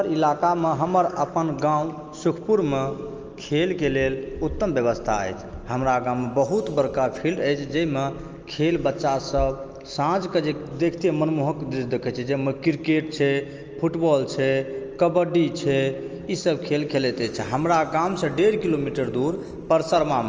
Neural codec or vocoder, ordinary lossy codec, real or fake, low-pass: none; Opus, 24 kbps; real; 7.2 kHz